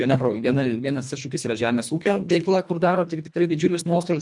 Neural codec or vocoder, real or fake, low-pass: codec, 24 kHz, 1.5 kbps, HILCodec; fake; 10.8 kHz